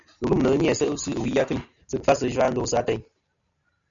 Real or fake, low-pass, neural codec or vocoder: real; 7.2 kHz; none